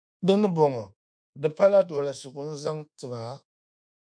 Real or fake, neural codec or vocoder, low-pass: fake; codec, 24 kHz, 1.2 kbps, DualCodec; 9.9 kHz